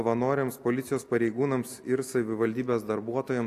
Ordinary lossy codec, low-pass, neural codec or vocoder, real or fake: AAC, 64 kbps; 14.4 kHz; none; real